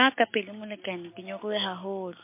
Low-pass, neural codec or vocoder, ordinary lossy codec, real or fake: 3.6 kHz; none; MP3, 24 kbps; real